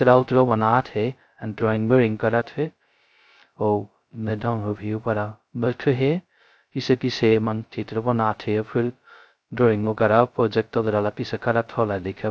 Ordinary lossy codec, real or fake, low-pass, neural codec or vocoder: none; fake; none; codec, 16 kHz, 0.2 kbps, FocalCodec